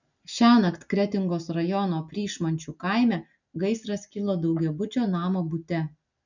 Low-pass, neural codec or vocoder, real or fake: 7.2 kHz; none; real